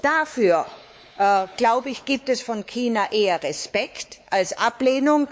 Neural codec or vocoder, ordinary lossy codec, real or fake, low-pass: codec, 16 kHz, 4 kbps, X-Codec, WavLM features, trained on Multilingual LibriSpeech; none; fake; none